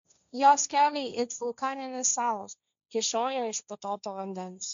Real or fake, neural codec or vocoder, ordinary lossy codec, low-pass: fake; codec, 16 kHz, 1.1 kbps, Voila-Tokenizer; MP3, 64 kbps; 7.2 kHz